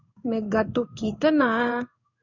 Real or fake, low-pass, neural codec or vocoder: fake; 7.2 kHz; codec, 16 kHz in and 24 kHz out, 1 kbps, XY-Tokenizer